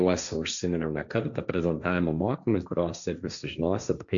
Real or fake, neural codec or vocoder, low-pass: fake; codec, 16 kHz, 1.1 kbps, Voila-Tokenizer; 7.2 kHz